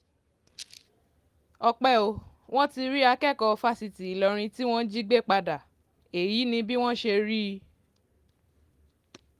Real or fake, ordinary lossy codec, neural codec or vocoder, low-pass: real; Opus, 24 kbps; none; 14.4 kHz